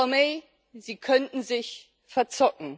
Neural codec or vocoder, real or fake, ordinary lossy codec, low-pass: none; real; none; none